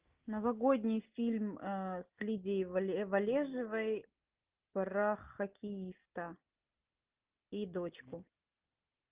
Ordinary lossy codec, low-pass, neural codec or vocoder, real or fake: Opus, 32 kbps; 3.6 kHz; none; real